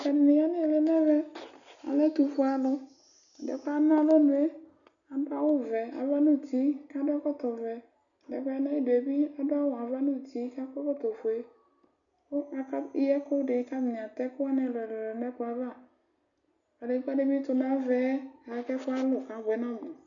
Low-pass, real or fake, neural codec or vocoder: 7.2 kHz; real; none